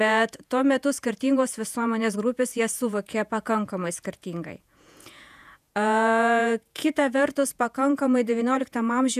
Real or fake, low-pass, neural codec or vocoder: fake; 14.4 kHz; vocoder, 48 kHz, 128 mel bands, Vocos